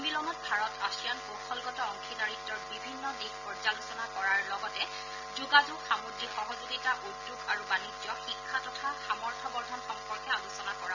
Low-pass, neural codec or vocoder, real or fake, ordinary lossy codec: 7.2 kHz; none; real; none